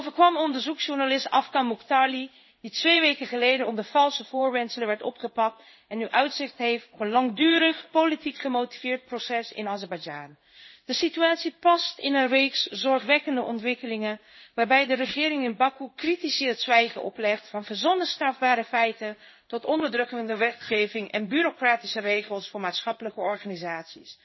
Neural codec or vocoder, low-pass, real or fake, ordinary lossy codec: codec, 16 kHz in and 24 kHz out, 1 kbps, XY-Tokenizer; 7.2 kHz; fake; MP3, 24 kbps